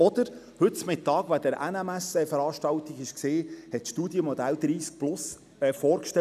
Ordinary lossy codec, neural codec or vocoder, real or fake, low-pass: none; none; real; 14.4 kHz